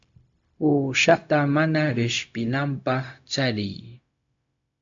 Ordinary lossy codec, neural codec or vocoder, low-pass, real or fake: AAC, 64 kbps; codec, 16 kHz, 0.4 kbps, LongCat-Audio-Codec; 7.2 kHz; fake